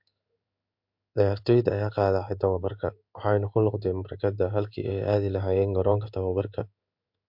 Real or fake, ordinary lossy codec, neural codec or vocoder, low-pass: fake; MP3, 48 kbps; codec, 16 kHz in and 24 kHz out, 1 kbps, XY-Tokenizer; 5.4 kHz